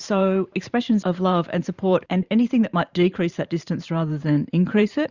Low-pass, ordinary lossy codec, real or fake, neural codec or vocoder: 7.2 kHz; Opus, 64 kbps; fake; vocoder, 44.1 kHz, 128 mel bands every 512 samples, BigVGAN v2